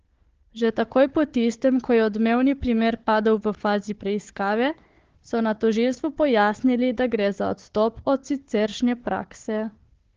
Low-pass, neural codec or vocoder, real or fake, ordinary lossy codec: 7.2 kHz; codec, 16 kHz, 4 kbps, FunCodec, trained on Chinese and English, 50 frames a second; fake; Opus, 16 kbps